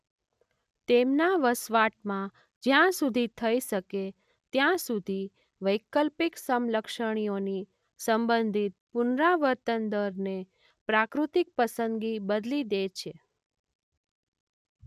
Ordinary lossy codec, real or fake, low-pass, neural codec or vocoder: none; real; 14.4 kHz; none